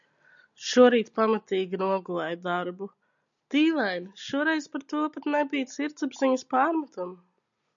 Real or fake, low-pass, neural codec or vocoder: real; 7.2 kHz; none